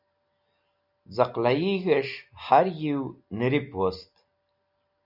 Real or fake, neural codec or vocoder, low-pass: real; none; 5.4 kHz